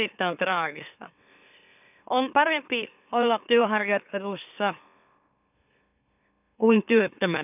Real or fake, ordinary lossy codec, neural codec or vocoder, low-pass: fake; none; autoencoder, 44.1 kHz, a latent of 192 numbers a frame, MeloTTS; 3.6 kHz